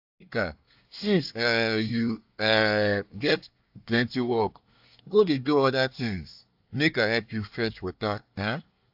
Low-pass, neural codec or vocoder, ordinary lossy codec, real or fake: 5.4 kHz; codec, 24 kHz, 1 kbps, SNAC; AAC, 48 kbps; fake